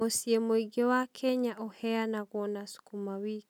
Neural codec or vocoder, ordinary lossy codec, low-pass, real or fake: none; none; 19.8 kHz; real